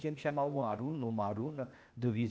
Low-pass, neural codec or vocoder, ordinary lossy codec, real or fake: none; codec, 16 kHz, 0.8 kbps, ZipCodec; none; fake